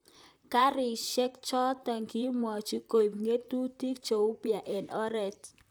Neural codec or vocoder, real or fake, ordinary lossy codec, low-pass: vocoder, 44.1 kHz, 128 mel bands, Pupu-Vocoder; fake; none; none